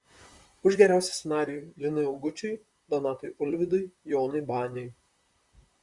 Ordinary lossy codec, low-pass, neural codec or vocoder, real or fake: Opus, 64 kbps; 10.8 kHz; vocoder, 44.1 kHz, 128 mel bands, Pupu-Vocoder; fake